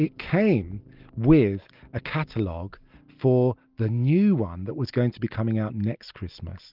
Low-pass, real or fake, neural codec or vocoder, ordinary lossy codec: 5.4 kHz; real; none; Opus, 32 kbps